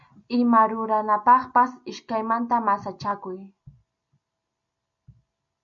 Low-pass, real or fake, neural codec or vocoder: 7.2 kHz; real; none